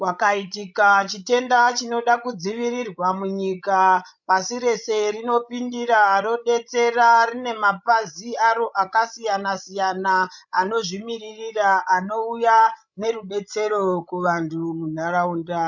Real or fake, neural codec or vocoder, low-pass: fake; codec, 16 kHz, 16 kbps, FreqCodec, larger model; 7.2 kHz